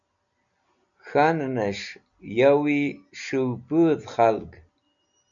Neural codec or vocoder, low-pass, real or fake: none; 7.2 kHz; real